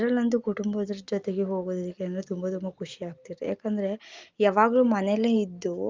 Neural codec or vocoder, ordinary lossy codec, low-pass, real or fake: none; Opus, 32 kbps; 7.2 kHz; real